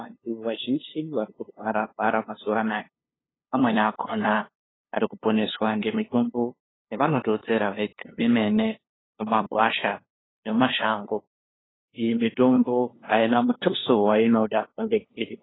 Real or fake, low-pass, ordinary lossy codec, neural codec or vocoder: fake; 7.2 kHz; AAC, 16 kbps; codec, 16 kHz, 2 kbps, FunCodec, trained on LibriTTS, 25 frames a second